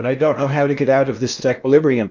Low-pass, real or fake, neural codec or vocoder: 7.2 kHz; fake; codec, 16 kHz in and 24 kHz out, 0.8 kbps, FocalCodec, streaming, 65536 codes